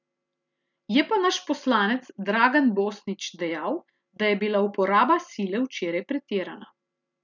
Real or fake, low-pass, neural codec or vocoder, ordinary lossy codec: real; 7.2 kHz; none; none